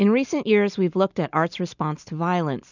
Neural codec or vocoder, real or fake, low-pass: none; real; 7.2 kHz